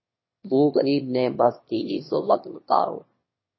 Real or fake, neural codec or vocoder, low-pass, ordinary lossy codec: fake; autoencoder, 22.05 kHz, a latent of 192 numbers a frame, VITS, trained on one speaker; 7.2 kHz; MP3, 24 kbps